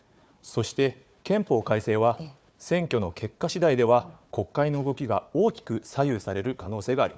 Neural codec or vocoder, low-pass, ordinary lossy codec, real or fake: codec, 16 kHz, 4 kbps, FunCodec, trained on Chinese and English, 50 frames a second; none; none; fake